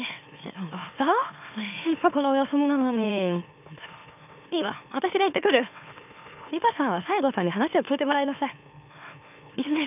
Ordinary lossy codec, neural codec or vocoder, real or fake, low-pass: none; autoencoder, 44.1 kHz, a latent of 192 numbers a frame, MeloTTS; fake; 3.6 kHz